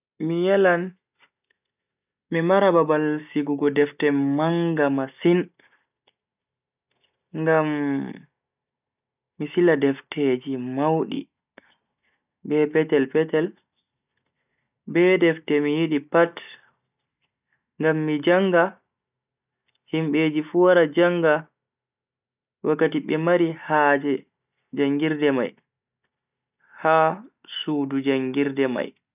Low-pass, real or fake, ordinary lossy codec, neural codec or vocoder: 3.6 kHz; real; none; none